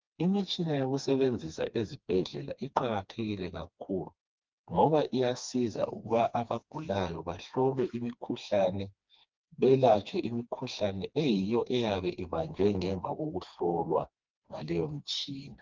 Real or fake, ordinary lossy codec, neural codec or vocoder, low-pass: fake; Opus, 32 kbps; codec, 16 kHz, 2 kbps, FreqCodec, smaller model; 7.2 kHz